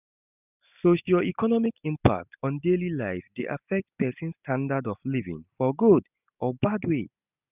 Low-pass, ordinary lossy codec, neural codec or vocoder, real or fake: 3.6 kHz; none; none; real